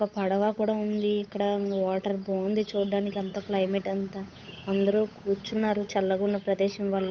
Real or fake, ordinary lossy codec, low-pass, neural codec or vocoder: fake; none; none; codec, 16 kHz, 8 kbps, FunCodec, trained on Chinese and English, 25 frames a second